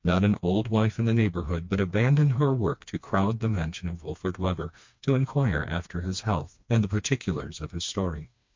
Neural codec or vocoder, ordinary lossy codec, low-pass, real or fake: codec, 16 kHz, 2 kbps, FreqCodec, smaller model; MP3, 48 kbps; 7.2 kHz; fake